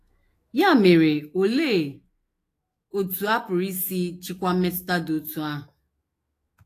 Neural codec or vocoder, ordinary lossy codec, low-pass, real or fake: autoencoder, 48 kHz, 128 numbers a frame, DAC-VAE, trained on Japanese speech; AAC, 48 kbps; 14.4 kHz; fake